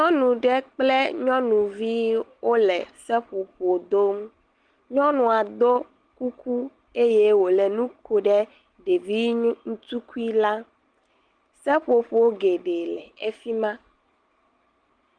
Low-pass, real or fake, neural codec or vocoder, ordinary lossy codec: 9.9 kHz; real; none; Opus, 24 kbps